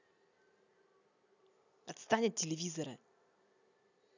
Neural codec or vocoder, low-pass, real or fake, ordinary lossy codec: none; 7.2 kHz; real; none